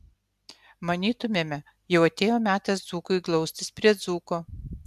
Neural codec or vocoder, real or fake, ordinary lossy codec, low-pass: none; real; MP3, 96 kbps; 14.4 kHz